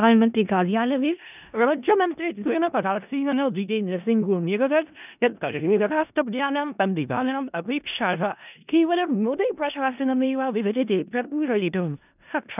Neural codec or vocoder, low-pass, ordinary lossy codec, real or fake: codec, 16 kHz in and 24 kHz out, 0.4 kbps, LongCat-Audio-Codec, four codebook decoder; 3.6 kHz; none; fake